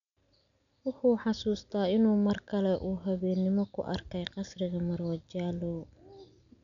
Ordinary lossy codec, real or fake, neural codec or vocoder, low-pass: none; real; none; 7.2 kHz